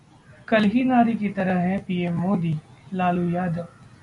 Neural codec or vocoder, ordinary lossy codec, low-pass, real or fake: none; MP3, 64 kbps; 10.8 kHz; real